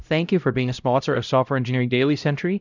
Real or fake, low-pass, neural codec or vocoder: fake; 7.2 kHz; codec, 16 kHz, 0.5 kbps, X-Codec, HuBERT features, trained on LibriSpeech